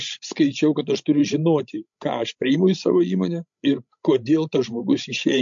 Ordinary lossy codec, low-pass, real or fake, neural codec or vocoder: MP3, 48 kbps; 7.2 kHz; fake; codec, 16 kHz, 16 kbps, FreqCodec, larger model